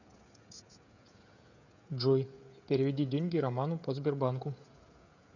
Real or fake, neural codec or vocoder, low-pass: fake; codec, 16 kHz, 16 kbps, FreqCodec, smaller model; 7.2 kHz